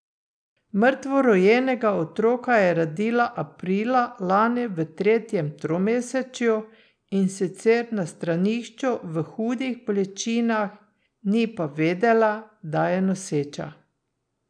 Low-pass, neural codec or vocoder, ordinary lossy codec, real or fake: 9.9 kHz; none; none; real